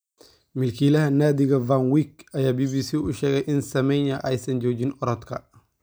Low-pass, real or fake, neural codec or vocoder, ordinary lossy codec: none; real; none; none